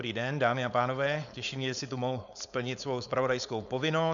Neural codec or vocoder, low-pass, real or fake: codec, 16 kHz, 4.8 kbps, FACodec; 7.2 kHz; fake